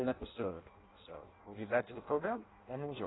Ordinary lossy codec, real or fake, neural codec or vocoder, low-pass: AAC, 16 kbps; fake; codec, 16 kHz in and 24 kHz out, 0.6 kbps, FireRedTTS-2 codec; 7.2 kHz